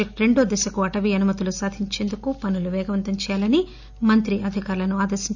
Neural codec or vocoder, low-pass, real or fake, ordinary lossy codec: none; 7.2 kHz; real; none